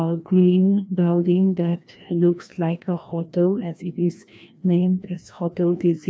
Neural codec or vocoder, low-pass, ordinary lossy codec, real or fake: codec, 16 kHz, 1 kbps, FreqCodec, larger model; none; none; fake